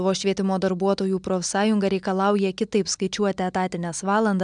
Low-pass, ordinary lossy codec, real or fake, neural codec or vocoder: 9.9 kHz; MP3, 96 kbps; real; none